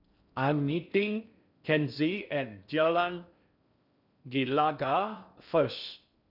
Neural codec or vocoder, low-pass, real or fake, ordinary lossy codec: codec, 16 kHz in and 24 kHz out, 0.6 kbps, FocalCodec, streaming, 4096 codes; 5.4 kHz; fake; none